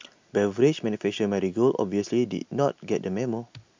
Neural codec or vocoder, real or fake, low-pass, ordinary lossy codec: none; real; 7.2 kHz; MP3, 64 kbps